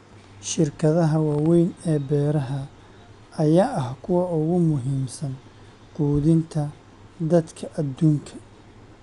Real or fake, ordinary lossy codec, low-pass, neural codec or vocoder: real; none; 10.8 kHz; none